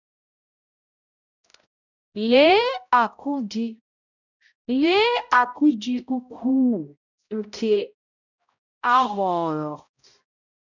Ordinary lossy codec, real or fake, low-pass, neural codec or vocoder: none; fake; 7.2 kHz; codec, 16 kHz, 0.5 kbps, X-Codec, HuBERT features, trained on balanced general audio